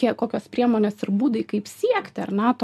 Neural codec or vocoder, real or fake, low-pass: none; real; 14.4 kHz